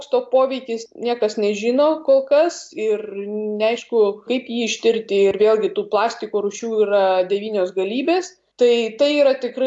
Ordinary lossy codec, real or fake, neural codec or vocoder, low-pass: AAC, 64 kbps; real; none; 10.8 kHz